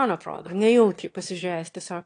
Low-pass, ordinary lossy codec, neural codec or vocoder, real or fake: 9.9 kHz; AAC, 48 kbps; autoencoder, 22.05 kHz, a latent of 192 numbers a frame, VITS, trained on one speaker; fake